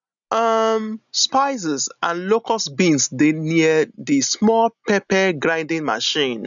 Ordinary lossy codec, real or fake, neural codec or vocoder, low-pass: none; real; none; 7.2 kHz